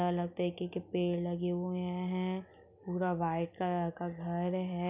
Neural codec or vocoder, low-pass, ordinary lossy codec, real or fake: none; 3.6 kHz; none; real